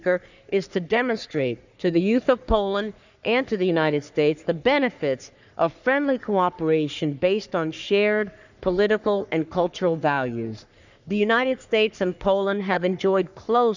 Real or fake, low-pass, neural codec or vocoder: fake; 7.2 kHz; codec, 44.1 kHz, 3.4 kbps, Pupu-Codec